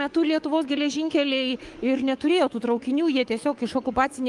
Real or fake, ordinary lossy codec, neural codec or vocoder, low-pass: fake; Opus, 24 kbps; codec, 24 kHz, 3.1 kbps, DualCodec; 10.8 kHz